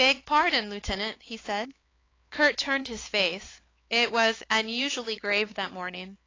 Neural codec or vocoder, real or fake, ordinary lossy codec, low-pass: codec, 16 kHz, 4 kbps, X-Codec, HuBERT features, trained on LibriSpeech; fake; AAC, 32 kbps; 7.2 kHz